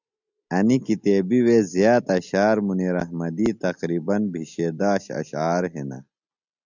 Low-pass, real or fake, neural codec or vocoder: 7.2 kHz; real; none